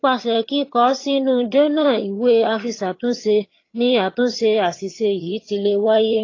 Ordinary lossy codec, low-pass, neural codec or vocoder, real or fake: AAC, 32 kbps; 7.2 kHz; vocoder, 22.05 kHz, 80 mel bands, HiFi-GAN; fake